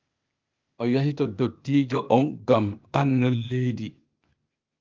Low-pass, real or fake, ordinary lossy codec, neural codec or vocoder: 7.2 kHz; fake; Opus, 24 kbps; codec, 16 kHz, 0.8 kbps, ZipCodec